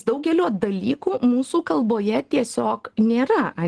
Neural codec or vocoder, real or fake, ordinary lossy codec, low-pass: none; real; Opus, 16 kbps; 10.8 kHz